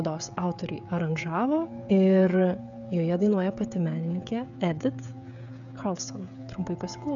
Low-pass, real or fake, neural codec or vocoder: 7.2 kHz; fake; codec, 16 kHz, 16 kbps, FreqCodec, smaller model